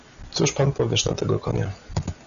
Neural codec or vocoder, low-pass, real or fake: none; 7.2 kHz; real